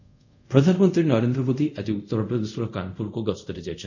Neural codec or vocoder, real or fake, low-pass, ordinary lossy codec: codec, 24 kHz, 0.5 kbps, DualCodec; fake; 7.2 kHz; none